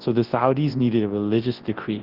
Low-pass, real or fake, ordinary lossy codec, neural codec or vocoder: 5.4 kHz; fake; Opus, 16 kbps; codec, 24 kHz, 0.9 kbps, WavTokenizer, large speech release